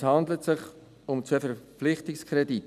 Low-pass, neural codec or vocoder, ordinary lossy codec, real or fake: 14.4 kHz; none; none; real